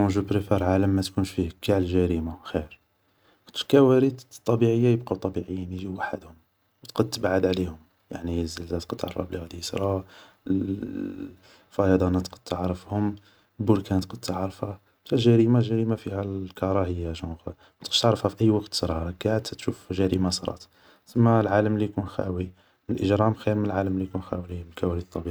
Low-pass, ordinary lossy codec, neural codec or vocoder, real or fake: none; none; none; real